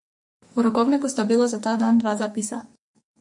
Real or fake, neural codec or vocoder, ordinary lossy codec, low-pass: fake; codec, 44.1 kHz, 2.6 kbps, SNAC; MP3, 48 kbps; 10.8 kHz